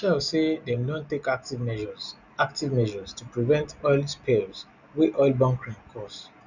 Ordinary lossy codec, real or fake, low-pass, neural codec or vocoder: none; real; 7.2 kHz; none